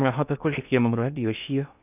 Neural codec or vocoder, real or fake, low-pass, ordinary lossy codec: codec, 16 kHz in and 24 kHz out, 0.6 kbps, FocalCodec, streaming, 2048 codes; fake; 3.6 kHz; none